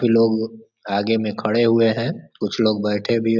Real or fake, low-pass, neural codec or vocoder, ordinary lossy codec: real; 7.2 kHz; none; none